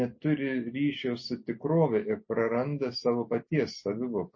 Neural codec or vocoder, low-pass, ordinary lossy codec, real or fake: none; 7.2 kHz; MP3, 32 kbps; real